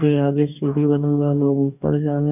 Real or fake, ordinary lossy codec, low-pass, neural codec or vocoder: fake; none; 3.6 kHz; codec, 44.1 kHz, 2.6 kbps, DAC